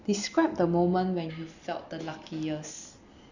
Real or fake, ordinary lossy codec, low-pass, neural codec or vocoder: real; none; 7.2 kHz; none